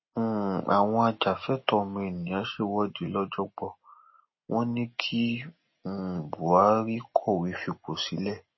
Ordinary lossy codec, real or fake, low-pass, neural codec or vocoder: MP3, 24 kbps; real; 7.2 kHz; none